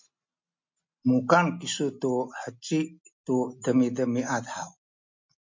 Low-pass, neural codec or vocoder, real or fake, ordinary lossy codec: 7.2 kHz; none; real; MP3, 48 kbps